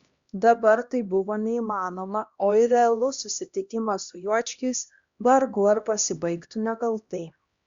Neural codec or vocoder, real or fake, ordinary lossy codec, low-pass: codec, 16 kHz, 1 kbps, X-Codec, HuBERT features, trained on LibriSpeech; fake; Opus, 64 kbps; 7.2 kHz